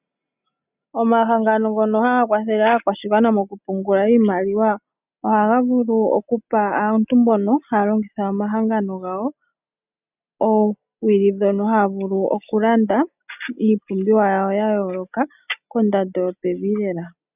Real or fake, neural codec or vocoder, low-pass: real; none; 3.6 kHz